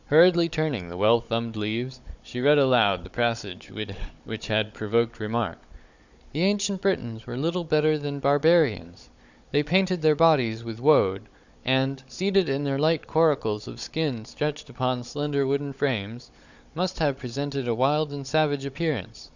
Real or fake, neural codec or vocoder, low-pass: fake; codec, 16 kHz, 16 kbps, FunCodec, trained on Chinese and English, 50 frames a second; 7.2 kHz